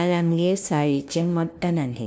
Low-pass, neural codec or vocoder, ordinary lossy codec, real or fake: none; codec, 16 kHz, 0.5 kbps, FunCodec, trained on LibriTTS, 25 frames a second; none; fake